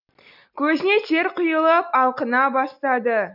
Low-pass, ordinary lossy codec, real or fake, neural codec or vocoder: 5.4 kHz; none; real; none